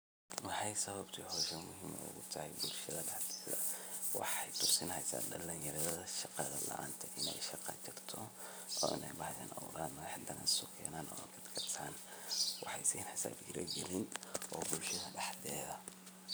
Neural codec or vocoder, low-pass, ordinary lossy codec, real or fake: none; none; none; real